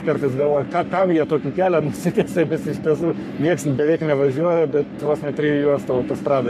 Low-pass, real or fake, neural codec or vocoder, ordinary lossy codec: 14.4 kHz; fake; codec, 44.1 kHz, 3.4 kbps, Pupu-Codec; MP3, 96 kbps